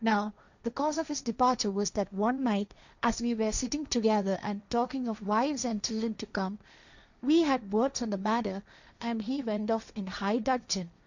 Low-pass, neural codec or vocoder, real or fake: 7.2 kHz; codec, 16 kHz, 1.1 kbps, Voila-Tokenizer; fake